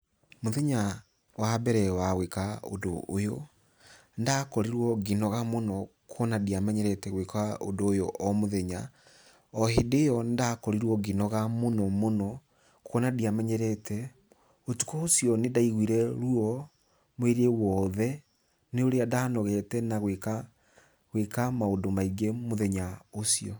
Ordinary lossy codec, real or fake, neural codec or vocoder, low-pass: none; real; none; none